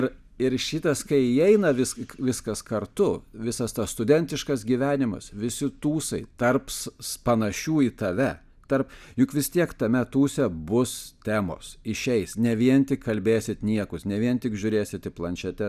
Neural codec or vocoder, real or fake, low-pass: none; real; 14.4 kHz